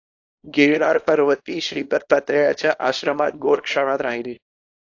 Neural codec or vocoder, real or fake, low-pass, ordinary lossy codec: codec, 24 kHz, 0.9 kbps, WavTokenizer, small release; fake; 7.2 kHz; AAC, 48 kbps